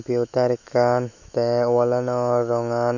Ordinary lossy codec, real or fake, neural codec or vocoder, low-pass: none; fake; autoencoder, 48 kHz, 128 numbers a frame, DAC-VAE, trained on Japanese speech; 7.2 kHz